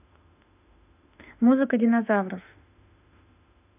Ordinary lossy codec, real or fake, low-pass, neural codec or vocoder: none; fake; 3.6 kHz; autoencoder, 48 kHz, 32 numbers a frame, DAC-VAE, trained on Japanese speech